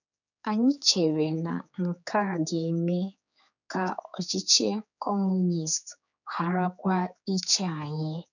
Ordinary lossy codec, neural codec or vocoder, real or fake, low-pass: none; codec, 16 kHz, 4 kbps, X-Codec, HuBERT features, trained on general audio; fake; 7.2 kHz